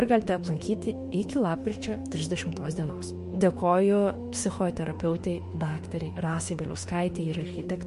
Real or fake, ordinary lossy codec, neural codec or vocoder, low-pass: fake; MP3, 48 kbps; autoencoder, 48 kHz, 32 numbers a frame, DAC-VAE, trained on Japanese speech; 14.4 kHz